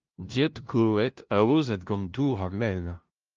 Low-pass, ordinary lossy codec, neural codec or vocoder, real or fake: 7.2 kHz; Opus, 24 kbps; codec, 16 kHz, 0.5 kbps, FunCodec, trained on LibriTTS, 25 frames a second; fake